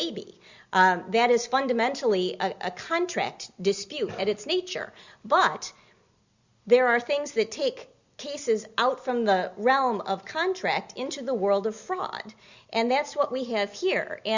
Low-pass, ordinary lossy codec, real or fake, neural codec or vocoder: 7.2 kHz; Opus, 64 kbps; real; none